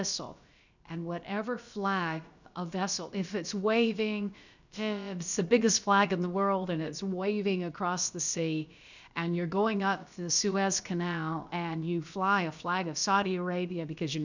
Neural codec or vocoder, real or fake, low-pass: codec, 16 kHz, about 1 kbps, DyCAST, with the encoder's durations; fake; 7.2 kHz